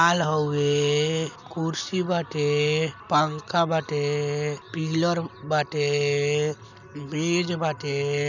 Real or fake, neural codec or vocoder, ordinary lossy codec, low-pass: real; none; none; 7.2 kHz